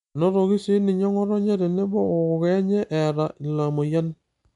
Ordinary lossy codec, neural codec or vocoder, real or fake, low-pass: none; none; real; 10.8 kHz